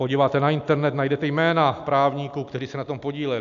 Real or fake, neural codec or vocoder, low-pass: real; none; 7.2 kHz